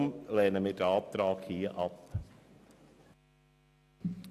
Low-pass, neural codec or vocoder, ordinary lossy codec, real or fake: 14.4 kHz; none; none; real